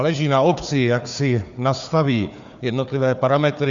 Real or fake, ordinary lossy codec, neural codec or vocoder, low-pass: fake; Opus, 64 kbps; codec, 16 kHz, 4 kbps, FunCodec, trained on Chinese and English, 50 frames a second; 7.2 kHz